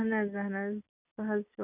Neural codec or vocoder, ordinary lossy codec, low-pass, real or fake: none; none; 3.6 kHz; real